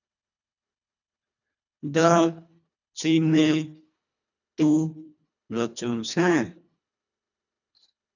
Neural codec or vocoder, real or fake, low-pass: codec, 24 kHz, 1.5 kbps, HILCodec; fake; 7.2 kHz